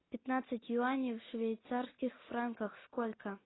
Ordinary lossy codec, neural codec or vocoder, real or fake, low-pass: AAC, 16 kbps; none; real; 7.2 kHz